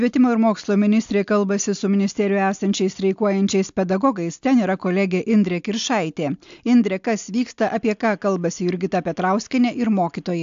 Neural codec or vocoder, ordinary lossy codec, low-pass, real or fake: none; AAC, 64 kbps; 7.2 kHz; real